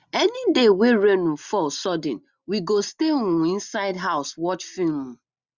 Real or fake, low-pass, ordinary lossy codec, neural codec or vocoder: real; 7.2 kHz; Opus, 64 kbps; none